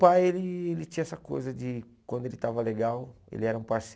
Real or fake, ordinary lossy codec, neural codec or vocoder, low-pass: real; none; none; none